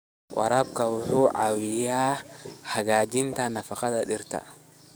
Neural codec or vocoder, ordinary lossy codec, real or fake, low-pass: codec, 44.1 kHz, 7.8 kbps, DAC; none; fake; none